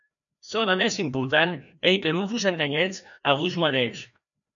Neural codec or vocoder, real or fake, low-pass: codec, 16 kHz, 1 kbps, FreqCodec, larger model; fake; 7.2 kHz